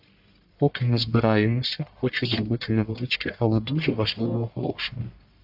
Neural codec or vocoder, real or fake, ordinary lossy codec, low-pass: codec, 44.1 kHz, 1.7 kbps, Pupu-Codec; fake; AAC, 48 kbps; 5.4 kHz